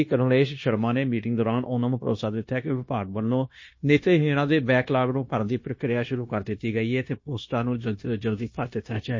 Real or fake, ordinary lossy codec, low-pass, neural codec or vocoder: fake; MP3, 32 kbps; 7.2 kHz; codec, 16 kHz, 0.9 kbps, LongCat-Audio-Codec